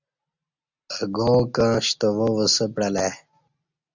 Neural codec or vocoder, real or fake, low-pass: none; real; 7.2 kHz